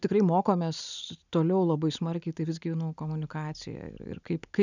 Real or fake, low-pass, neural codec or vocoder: real; 7.2 kHz; none